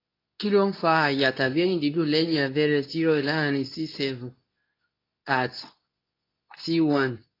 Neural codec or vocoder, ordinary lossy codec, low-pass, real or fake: codec, 24 kHz, 0.9 kbps, WavTokenizer, medium speech release version 2; AAC, 32 kbps; 5.4 kHz; fake